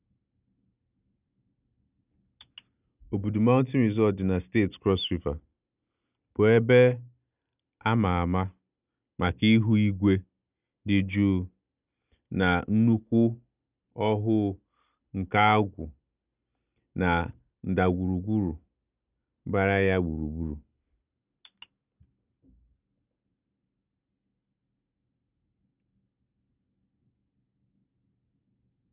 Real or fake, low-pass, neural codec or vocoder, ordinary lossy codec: real; 3.6 kHz; none; none